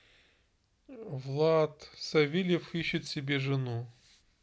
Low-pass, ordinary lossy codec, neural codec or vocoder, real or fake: none; none; none; real